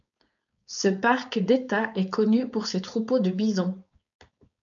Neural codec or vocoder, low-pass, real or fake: codec, 16 kHz, 4.8 kbps, FACodec; 7.2 kHz; fake